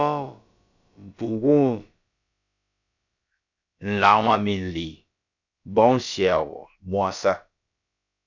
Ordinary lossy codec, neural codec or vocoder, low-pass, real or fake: MP3, 64 kbps; codec, 16 kHz, about 1 kbps, DyCAST, with the encoder's durations; 7.2 kHz; fake